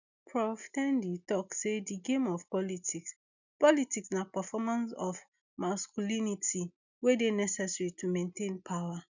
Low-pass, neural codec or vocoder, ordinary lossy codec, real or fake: 7.2 kHz; none; none; real